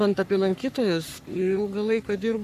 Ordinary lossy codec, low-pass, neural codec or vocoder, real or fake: AAC, 96 kbps; 14.4 kHz; codec, 44.1 kHz, 3.4 kbps, Pupu-Codec; fake